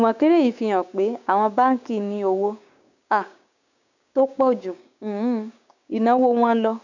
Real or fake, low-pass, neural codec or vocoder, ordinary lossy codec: fake; 7.2 kHz; codec, 16 kHz, 6 kbps, DAC; none